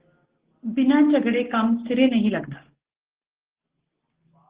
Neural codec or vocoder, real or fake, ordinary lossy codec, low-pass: none; real; Opus, 16 kbps; 3.6 kHz